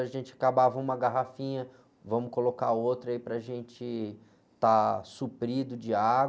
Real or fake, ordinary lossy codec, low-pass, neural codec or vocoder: real; none; none; none